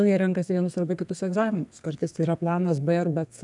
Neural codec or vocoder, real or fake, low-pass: codec, 32 kHz, 1.9 kbps, SNAC; fake; 10.8 kHz